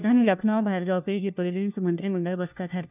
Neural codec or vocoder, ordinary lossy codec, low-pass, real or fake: codec, 16 kHz, 1 kbps, FunCodec, trained on LibriTTS, 50 frames a second; none; 3.6 kHz; fake